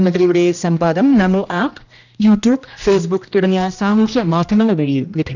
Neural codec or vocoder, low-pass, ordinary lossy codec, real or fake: codec, 16 kHz, 1 kbps, X-Codec, HuBERT features, trained on general audio; 7.2 kHz; none; fake